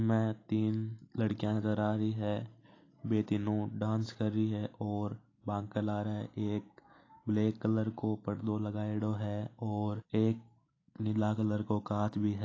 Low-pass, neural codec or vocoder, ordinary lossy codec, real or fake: 7.2 kHz; none; AAC, 32 kbps; real